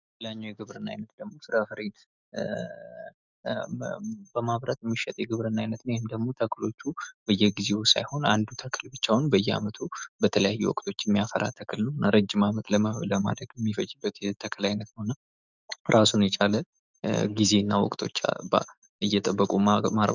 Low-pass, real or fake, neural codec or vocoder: 7.2 kHz; fake; autoencoder, 48 kHz, 128 numbers a frame, DAC-VAE, trained on Japanese speech